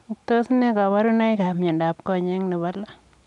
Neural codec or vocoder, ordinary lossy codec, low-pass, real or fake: none; none; 10.8 kHz; real